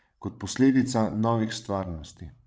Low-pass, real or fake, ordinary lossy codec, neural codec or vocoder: none; fake; none; codec, 16 kHz, 16 kbps, FreqCodec, larger model